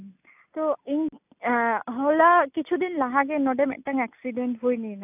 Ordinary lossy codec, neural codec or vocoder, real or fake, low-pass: AAC, 32 kbps; none; real; 3.6 kHz